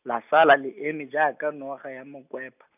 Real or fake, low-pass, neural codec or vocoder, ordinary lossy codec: real; 3.6 kHz; none; none